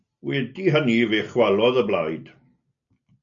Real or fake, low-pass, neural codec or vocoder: real; 7.2 kHz; none